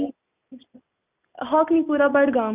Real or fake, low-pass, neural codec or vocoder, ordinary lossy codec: real; 3.6 kHz; none; Opus, 64 kbps